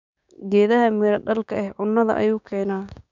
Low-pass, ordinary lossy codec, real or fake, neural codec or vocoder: 7.2 kHz; none; fake; codec, 16 kHz, 6 kbps, DAC